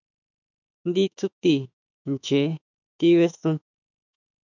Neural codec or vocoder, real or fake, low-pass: autoencoder, 48 kHz, 32 numbers a frame, DAC-VAE, trained on Japanese speech; fake; 7.2 kHz